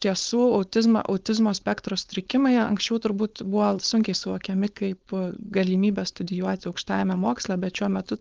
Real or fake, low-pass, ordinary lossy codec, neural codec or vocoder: fake; 7.2 kHz; Opus, 32 kbps; codec, 16 kHz, 4.8 kbps, FACodec